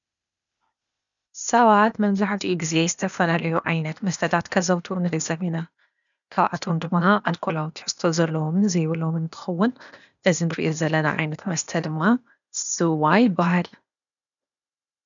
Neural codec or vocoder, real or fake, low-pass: codec, 16 kHz, 0.8 kbps, ZipCodec; fake; 7.2 kHz